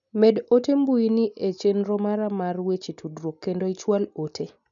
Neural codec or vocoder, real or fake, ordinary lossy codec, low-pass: none; real; none; 7.2 kHz